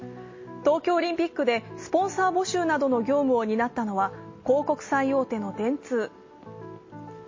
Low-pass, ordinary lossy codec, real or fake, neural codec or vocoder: 7.2 kHz; MP3, 32 kbps; real; none